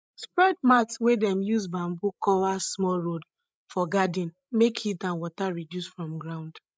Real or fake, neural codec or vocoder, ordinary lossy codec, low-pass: fake; codec, 16 kHz, 16 kbps, FreqCodec, larger model; none; none